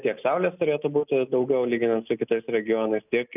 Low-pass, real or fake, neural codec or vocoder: 3.6 kHz; real; none